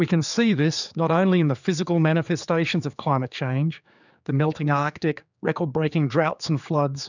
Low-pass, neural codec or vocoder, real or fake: 7.2 kHz; codec, 16 kHz, 4 kbps, X-Codec, HuBERT features, trained on general audio; fake